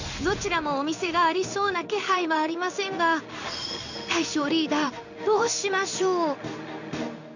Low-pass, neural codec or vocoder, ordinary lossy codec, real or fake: 7.2 kHz; codec, 16 kHz in and 24 kHz out, 1 kbps, XY-Tokenizer; none; fake